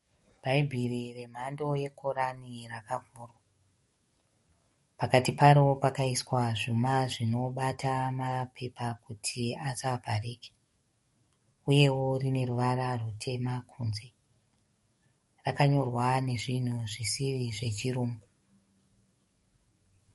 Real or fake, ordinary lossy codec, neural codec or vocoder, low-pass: fake; MP3, 48 kbps; codec, 44.1 kHz, 7.8 kbps, DAC; 19.8 kHz